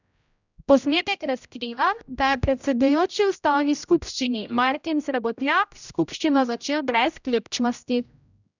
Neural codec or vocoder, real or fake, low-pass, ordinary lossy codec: codec, 16 kHz, 0.5 kbps, X-Codec, HuBERT features, trained on general audio; fake; 7.2 kHz; none